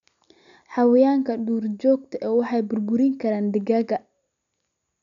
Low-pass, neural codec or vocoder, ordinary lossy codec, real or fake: 7.2 kHz; none; MP3, 96 kbps; real